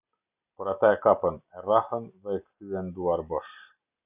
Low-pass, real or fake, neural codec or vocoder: 3.6 kHz; real; none